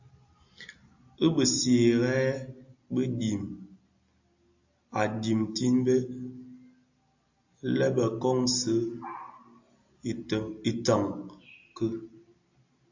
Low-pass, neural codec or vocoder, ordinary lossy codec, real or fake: 7.2 kHz; none; AAC, 48 kbps; real